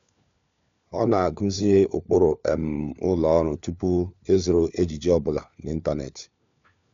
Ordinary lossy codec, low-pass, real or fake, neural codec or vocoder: MP3, 64 kbps; 7.2 kHz; fake; codec, 16 kHz, 4 kbps, FunCodec, trained on LibriTTS, 50 frames a second